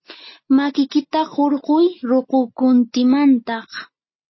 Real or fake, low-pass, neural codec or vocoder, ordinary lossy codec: real; 7.2 kHz; none; MP3, 24 kbps